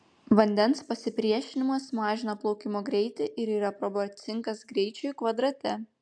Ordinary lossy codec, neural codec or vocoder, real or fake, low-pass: AAC, 64 kbps; none; real; 9.9 kHz